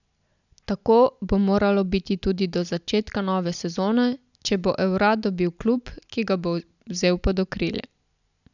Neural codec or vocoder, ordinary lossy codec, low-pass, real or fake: none; none; 7.2 kHz; real